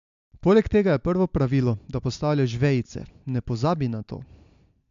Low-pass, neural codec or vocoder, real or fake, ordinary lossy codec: 7.2 kHz; none; real; AAC, 64 kbps